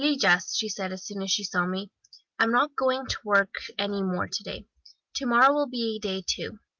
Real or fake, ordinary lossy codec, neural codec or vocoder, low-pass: real; Opus, 24 kbps; none; 7.2 kHz